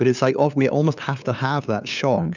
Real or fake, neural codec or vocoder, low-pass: fake; codec, 16 kHz, 2 kbps, FunCodec, trained on LibriTTS, 25 frames a second; 7.2 kHz